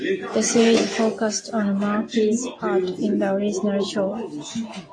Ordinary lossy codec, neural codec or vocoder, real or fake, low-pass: AAC, 32 kbps; none; real; 9.9 kHz